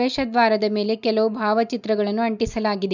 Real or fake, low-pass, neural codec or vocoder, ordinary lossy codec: real; 7.2 kHz; none; none